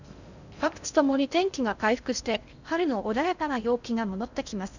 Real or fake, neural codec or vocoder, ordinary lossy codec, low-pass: fake; codec, 16 kHz in and 24 kHz out, 0.6 kbps, FocalCodec, streaming, 2048 codes; none; 7.2 kHz